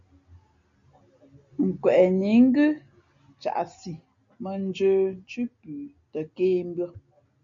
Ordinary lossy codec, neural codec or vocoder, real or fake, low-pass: MP3, 64 kbps; none; real; 7.2 kHz